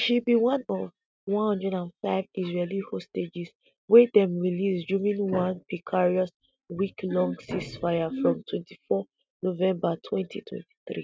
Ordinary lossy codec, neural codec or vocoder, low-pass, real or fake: none; none; none; real